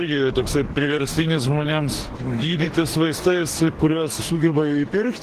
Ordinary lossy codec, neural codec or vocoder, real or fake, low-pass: Opus, 16 kbps; codec, 44.1 kHz, 2.6 kbps, DAC; fake; 14.4 kHz